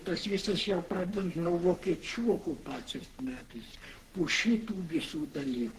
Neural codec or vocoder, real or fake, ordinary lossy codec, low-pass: codec, 44.1 kHz, 3.4 kbps, Pupu-Codec; fake; Opus, 16 kbps; 14.4 kHz